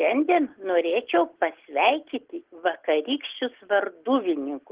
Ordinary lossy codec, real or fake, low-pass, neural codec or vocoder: Opus, 16 kbps; real; 3.6 kHz; none